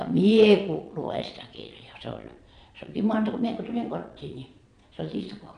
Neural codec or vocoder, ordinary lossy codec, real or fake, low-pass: vocoder, 22.05 kHz, 80 mel bands, Vocos; none; fake; 9.9 kHz